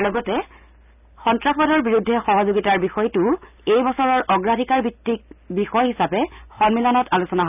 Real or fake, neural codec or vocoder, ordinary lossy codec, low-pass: real; none; none; 3.6 kHz